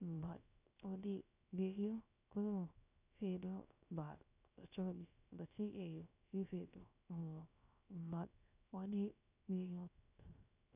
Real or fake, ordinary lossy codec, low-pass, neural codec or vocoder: fake; none; 3.6 kHz; codec, 16 kHz, 0.3 kbps, FocalCodec